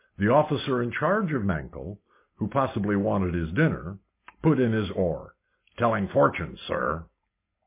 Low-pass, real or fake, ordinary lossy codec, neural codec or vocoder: 3.6 kHz; real; MP3, 24 kbps; none